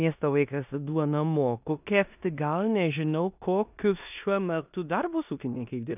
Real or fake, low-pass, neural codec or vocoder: fake; 3.6 kHz; codec, 16 kHz in and 24 kHz out, 0.9 kbps, LongCat-Audio-Codec, four codebook decoder